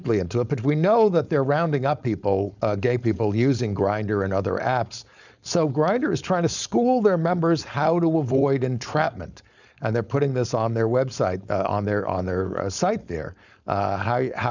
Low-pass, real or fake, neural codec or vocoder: 7.2 kHz; fake; codec, 16 kHz, 4.8 kbps, FACodec